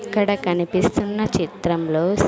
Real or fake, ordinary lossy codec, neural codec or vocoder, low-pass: real; none; none; none